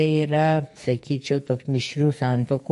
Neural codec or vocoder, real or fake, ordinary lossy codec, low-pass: codec, 44.1 kHz, 2.6 kbps, SNAC; fake; MP3, 48 kbps; 14.4 kHz